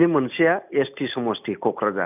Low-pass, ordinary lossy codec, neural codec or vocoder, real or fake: 3.6 kHz; none; none; real